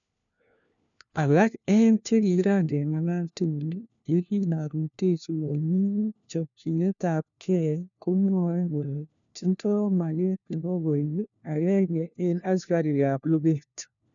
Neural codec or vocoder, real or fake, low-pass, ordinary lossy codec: codec, 16 kHz, 1 kbps, FunCodec, trained on LibriTTS, 50 frames a second; fake; 7.2 kHz; none